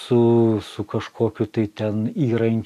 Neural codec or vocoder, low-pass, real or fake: none; 14.4 kHz; real